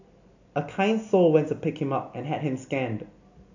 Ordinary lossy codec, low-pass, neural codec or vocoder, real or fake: AAC, 48 kbps; 7.2 kHz; none; real